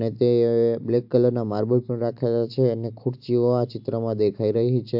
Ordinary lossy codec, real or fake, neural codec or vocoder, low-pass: AAC, 48 kbps; real; none; 5.4 kHz